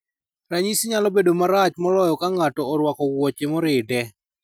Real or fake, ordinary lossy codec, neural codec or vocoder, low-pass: real; none; none; none